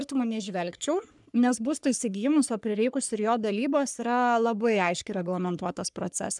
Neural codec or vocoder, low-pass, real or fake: codec, 44.1 kHz, 3.4 kbps, Pupu-Codec; 10.8 kHz; fake